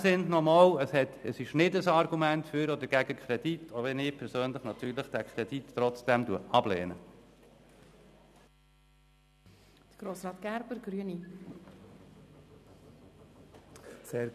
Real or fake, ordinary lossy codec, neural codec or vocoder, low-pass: real; none; none; 14.4 kHz